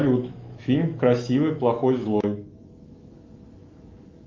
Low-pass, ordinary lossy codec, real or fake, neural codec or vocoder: 7.2 kHz; Opus, 24 kbps; fake; autoencoder, 48 kHz, 128 numbers a frame, DAC-VAE, trained on Japanese speech